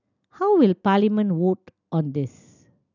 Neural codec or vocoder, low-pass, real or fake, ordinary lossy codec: none; 7.2 kHz; real; none